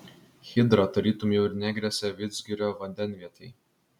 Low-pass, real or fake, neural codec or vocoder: 19.8 kHz; real; none